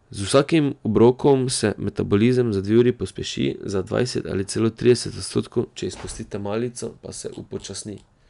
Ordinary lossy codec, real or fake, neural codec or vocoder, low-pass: none; real; none; 10.8 kHz